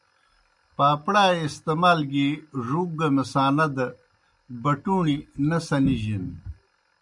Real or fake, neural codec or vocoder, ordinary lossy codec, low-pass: real; none; MP3, 96 kbps; 10.8 kHz